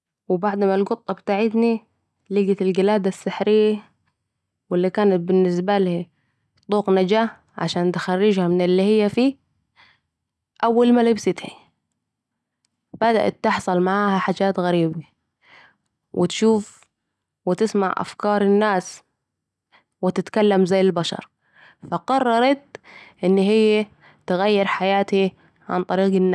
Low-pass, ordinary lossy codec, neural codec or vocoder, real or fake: none; none; none; real